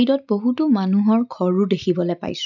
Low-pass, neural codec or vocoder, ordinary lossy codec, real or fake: 7.2 kHz; none; none; real